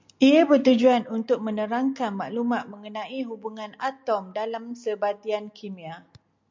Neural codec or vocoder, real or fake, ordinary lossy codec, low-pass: none; real; MP3, 64 kbps; 7.2 kHz